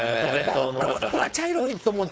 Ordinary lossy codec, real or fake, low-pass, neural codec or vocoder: none; fake; none; codec, 16 kHz, 4.8 kbps, FACodec